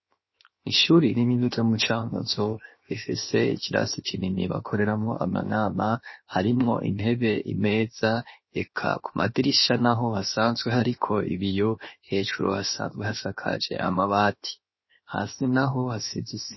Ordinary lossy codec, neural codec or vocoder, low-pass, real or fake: MP3, 24 kbps; codec, 16 kHz, 0.7 kbps, FocalCodec; 7.2 kHz; fake